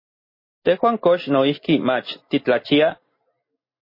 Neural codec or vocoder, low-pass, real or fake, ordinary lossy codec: none; 5.4 kHz; real; MP3, 24 kbps